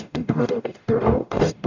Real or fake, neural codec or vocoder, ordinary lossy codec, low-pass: fake; codec, 44.1 kHz, 0.9 kbps, DAC; none; 7.2 kHz